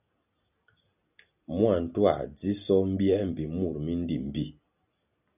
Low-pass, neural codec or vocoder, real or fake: 3.6 kHz; none; real